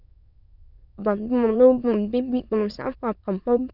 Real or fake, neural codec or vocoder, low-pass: fake; autoencoder, 22.05 kHz, a latent of 192 numbers a frame, VITS, trained on many speakers; 5.4 kHz